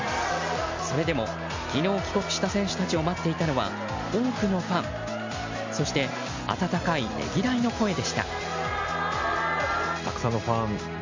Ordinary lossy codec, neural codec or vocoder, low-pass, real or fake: MP3, 64 kbps; none; 7.2 kHz; real